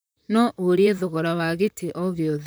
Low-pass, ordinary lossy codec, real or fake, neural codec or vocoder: none; none; fake; vocoder, 44.1 kHz, 128 mel bands, Pupu-Vocoder